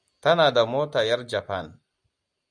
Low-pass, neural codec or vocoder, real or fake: 9.9 kHz; none; real